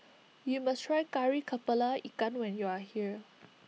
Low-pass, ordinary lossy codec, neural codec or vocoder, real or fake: none; none; none; real